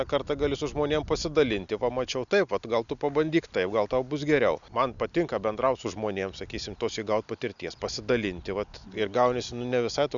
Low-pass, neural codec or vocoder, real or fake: 7.2 kHz; none; real